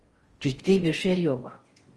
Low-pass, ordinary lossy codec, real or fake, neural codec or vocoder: 10.8 kHz; Opus, 24 kbps; fake; codec, 16 kHz in and 24 kHz out, 0.6 kbps, FocalCodec, streaming, 4096 codes